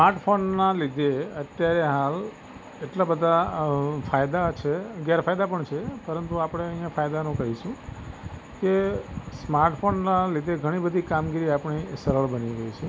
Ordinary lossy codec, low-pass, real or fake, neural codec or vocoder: none; none; real; none